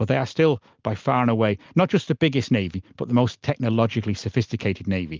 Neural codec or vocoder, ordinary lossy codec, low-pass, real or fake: none; Opus, 24 kbps; 7.2 kHz; real